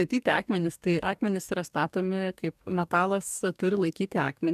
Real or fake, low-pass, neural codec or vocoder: fake; 14.4 kHz; codec, 44.1 kHz, 2.6 kbps, DAC